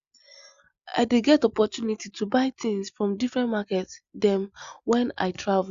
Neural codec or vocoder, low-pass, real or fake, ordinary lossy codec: none; 7.2 kHz; real; Opus, 64 kbps